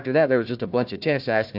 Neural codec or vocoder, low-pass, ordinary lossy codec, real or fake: codec, 16 kHz, 1 kbps, FunCodec, trained on Chinese and English, 50 frames a second; 5.4 kHz; AAC, 48 kbps; fake